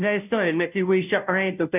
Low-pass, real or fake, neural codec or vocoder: 3.6 kHz; fake; codec, 16 kHz, 0.5 kbps, FunCodec, trained on Chinese and English, 25 frames a second